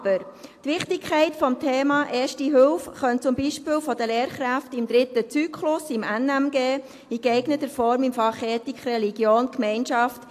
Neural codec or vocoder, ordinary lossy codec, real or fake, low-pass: none; AAC, 64 kbps; real; 14.4 kHz